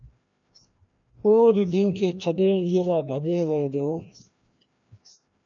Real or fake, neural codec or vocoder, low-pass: fake; codec, 16 kHz, 1 kbps, FreqCodec, larger model; 7.2 kHz